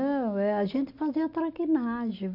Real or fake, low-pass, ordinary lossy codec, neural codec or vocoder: real; 5.4 kHz; AAC, 48 kbps; none